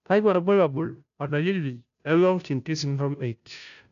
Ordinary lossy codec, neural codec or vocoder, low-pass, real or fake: none; codec, 16 kHz, 0.5 kbps, FunCodec, trained on Chinese and English, 25 frames a second; 7.2 kHz; fake